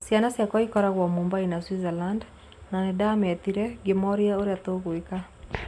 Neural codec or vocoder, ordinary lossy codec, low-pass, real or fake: none; none; none; real